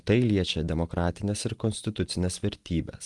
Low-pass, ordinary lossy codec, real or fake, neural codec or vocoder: 10.8 kHz; Opus, 24 kbps; real; none